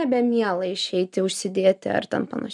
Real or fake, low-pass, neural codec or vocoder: real; 10.8 kHz; none